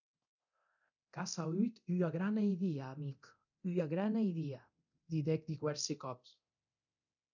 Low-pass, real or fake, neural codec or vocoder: 7.2 kHz; fake; codec, 24 kHz, 0.9 kbps, DualCodec